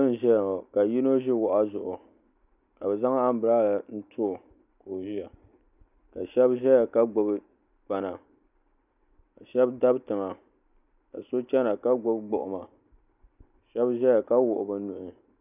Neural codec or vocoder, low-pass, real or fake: none; 3.6 kHz; real